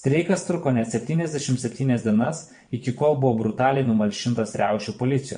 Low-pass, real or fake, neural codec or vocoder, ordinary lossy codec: 9.9 kHz; fake; vocoder, 22.05 kHz, 80 mel bands, WaveNeXt; MP3, 48 kbps